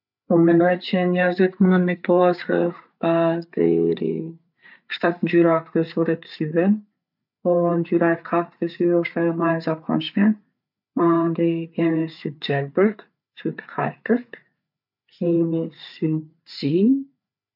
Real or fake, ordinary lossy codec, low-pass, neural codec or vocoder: fake; none; 5.4 kHz; codec, 16 kHz, 8 kbps, FreqCodec, larger model